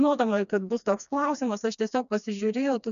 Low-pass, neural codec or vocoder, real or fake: 7.2 kHz; codec, 16 kHz, 2 kbps, FreqCodec, smaller model; fake